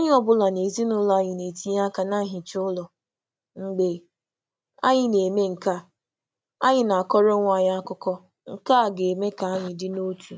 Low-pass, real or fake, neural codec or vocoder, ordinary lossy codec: none; real; none; none